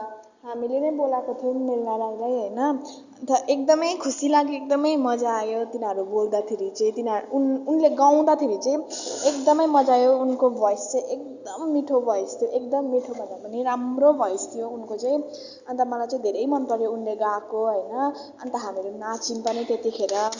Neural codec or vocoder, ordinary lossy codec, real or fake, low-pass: none; Opus, 64 kbps; real; 7.2 kHz